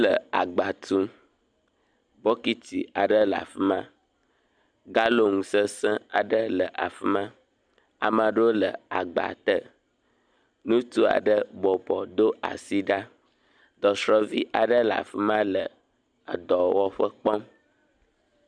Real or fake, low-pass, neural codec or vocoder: real; 9.9 kHz; none